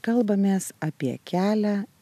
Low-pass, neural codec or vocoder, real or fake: 14.4 kHz; vocoder, 44.1 kHz, 128 mel bands every 256 samples, BigVGAN v2; fake